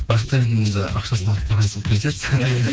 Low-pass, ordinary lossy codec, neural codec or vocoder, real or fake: none; none; codec, 16 kHz, 2 kbps, FreqCodec, smaller model; fake